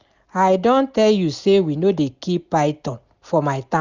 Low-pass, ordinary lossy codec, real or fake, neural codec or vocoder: 7.2 kHz; Opus, 64 kbps; real; none